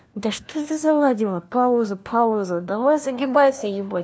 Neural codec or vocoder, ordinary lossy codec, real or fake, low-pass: codec, 16 kHz, 1 kbps, FunCodec, trained on LibriTTS, 50 frames a second; none; fake; none